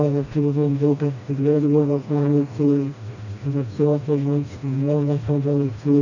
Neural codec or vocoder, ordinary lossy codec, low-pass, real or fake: codec, 16 kHz, 1 kbps, FreqCodec, smaller model; none; 7.2 kHz; fake